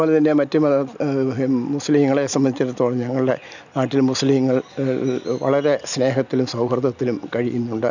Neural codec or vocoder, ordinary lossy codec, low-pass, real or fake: none; none; 7.2 kHz; real